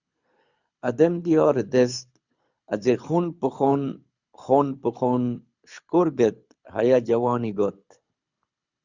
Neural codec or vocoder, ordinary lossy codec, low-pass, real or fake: codec, 24 kHz, 6 kbps, HILCodec; Opus, 64 kbps; 7.2 kHz; fake